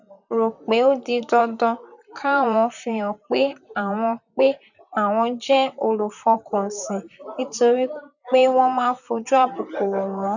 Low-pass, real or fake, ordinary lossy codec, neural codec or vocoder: 7.2 kHz; fake; none; vocoder, 44.1 kHz, 128 mel bands, Pupu-Vocoder